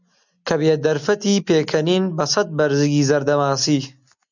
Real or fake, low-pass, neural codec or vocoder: real; 7.2 kHz; none